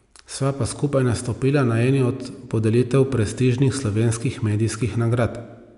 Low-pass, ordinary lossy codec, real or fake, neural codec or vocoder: 10.8 kHz; none; real; none